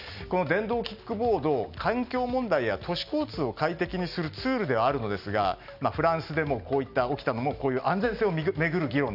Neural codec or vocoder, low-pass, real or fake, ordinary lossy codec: none; 5.4 kHz; real; none